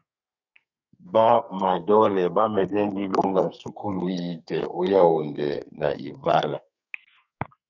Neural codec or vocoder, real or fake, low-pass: codec, 32 kHz, 1.9 kbps, SNAC; fake; 7.2 kHz